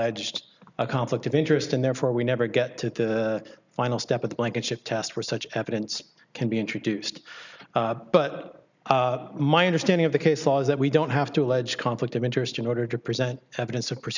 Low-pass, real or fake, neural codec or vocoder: 7.2 kHz; real; none